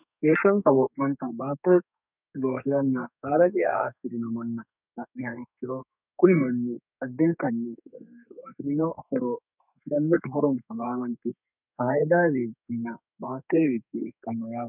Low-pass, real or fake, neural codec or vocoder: 3.6 kHz; fake; codec, 32 kHz, 1.9 kbps, SNAC